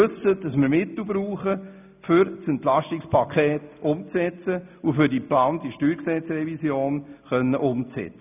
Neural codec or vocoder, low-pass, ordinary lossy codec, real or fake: none; 3.6 kHz; none; real